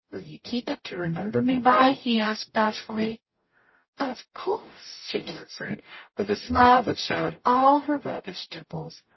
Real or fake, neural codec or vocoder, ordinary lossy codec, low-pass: fake; codec, 44.1 kHz, 0.9 kbps, DAC; MP3, 24 kbps; 7.2 kHz